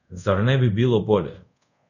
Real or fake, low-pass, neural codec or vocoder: fake; 7.2 kHz; codec, 24 kHz, 0.5 kbps, DualCodec